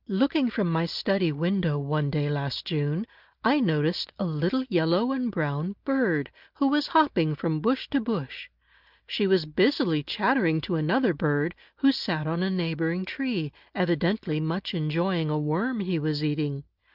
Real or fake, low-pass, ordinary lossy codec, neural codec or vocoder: real; 5.4 kHz; Opus, 32 kbps; none